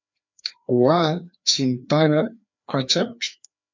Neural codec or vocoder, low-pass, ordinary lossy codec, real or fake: codec, 16 kHz, 2 kbps, FreqCodec, larger model; 7.2 kHz; MP3, 64 kbps; fake